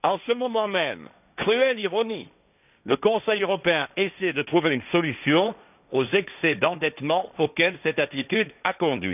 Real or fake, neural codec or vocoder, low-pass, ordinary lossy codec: fake; codec, 16 kHz, 1.1 kbps, Voila-Tokenizer; 3.6 kHz; none